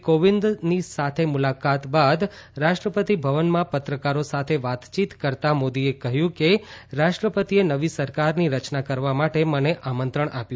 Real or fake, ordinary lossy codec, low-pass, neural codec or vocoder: real; none; none; none